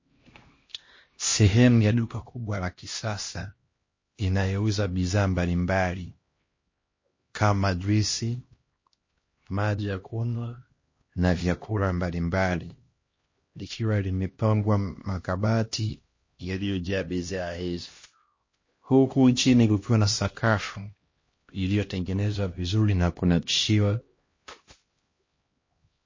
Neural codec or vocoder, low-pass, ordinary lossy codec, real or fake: codec, 16 kHz, 1 kbps, X-Codec, HuBERT features, trained on LibriSpeech; 7.2 kHz; MP3, 32 kbps; fake